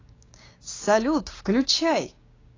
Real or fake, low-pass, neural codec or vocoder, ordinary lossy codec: fake; 7.2 kHz; codec, 16 kHz, 6 kbps, DAC; AAC, 32 kbps